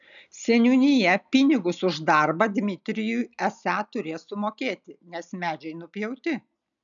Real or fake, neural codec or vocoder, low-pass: real; none; 7.2 kHz